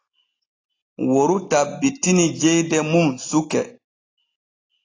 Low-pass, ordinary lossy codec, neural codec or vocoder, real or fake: 7.2 kHz; AAC, 32 kbps; none; real